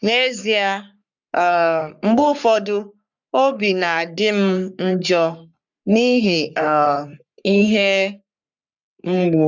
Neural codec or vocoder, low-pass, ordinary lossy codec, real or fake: codec, 44.1 kHz, 3.4 kbps, Pupu-Codec; 7.2 kHz; none; fake